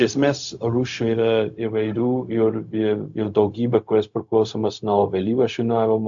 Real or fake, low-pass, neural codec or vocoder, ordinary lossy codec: fake; 7.2 kHz; codec, 16 kHz, 0.4 kbps, LongCat-Audio-Codec; AAC, 64 kbps